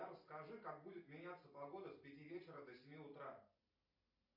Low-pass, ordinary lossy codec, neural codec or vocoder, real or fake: 5.4 kHz; AAC, 48 kbps; none; real